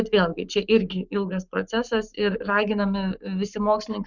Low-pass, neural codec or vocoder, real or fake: 7.2 kHz; codec, 44.1 kHz, 7.8 kbps, Pupu-Codec; fake